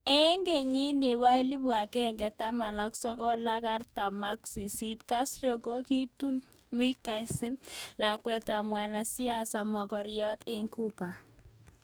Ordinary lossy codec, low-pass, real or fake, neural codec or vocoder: none; none; fake; codec, 44.1 kHz, 2.6 kbps, DAC